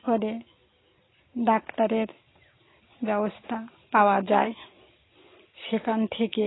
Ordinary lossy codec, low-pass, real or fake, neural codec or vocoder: AAC, 16 kbps; 7.2 kHz; real; none